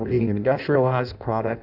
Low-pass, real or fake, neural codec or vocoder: 5.4 kHz; fake; codec, 16 kHz in and 24 kHz out, 0.6 kbps, FireRedTTS-2 codec